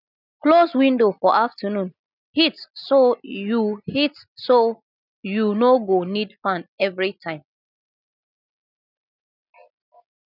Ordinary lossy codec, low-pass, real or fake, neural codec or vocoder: none; 5.4 kHz; real; none